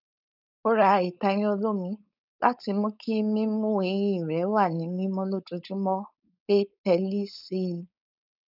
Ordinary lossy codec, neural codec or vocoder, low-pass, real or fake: none; codec, 16 kHz, 4.8 kbps, FACodec; 5.4 kHz; fake